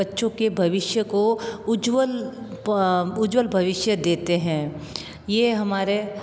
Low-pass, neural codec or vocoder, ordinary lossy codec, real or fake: none; none; none; real